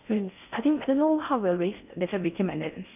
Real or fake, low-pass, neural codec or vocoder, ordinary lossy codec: fake; 3.6 kHz; codec, 16 kHz in and 24 kHz out, 0.6 kbps, FocalCodec, streaming, 4096 codes; none